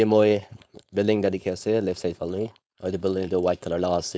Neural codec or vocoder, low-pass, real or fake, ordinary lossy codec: codec, 16 kHz, 4.8 kbps, FACodec; none; fake; none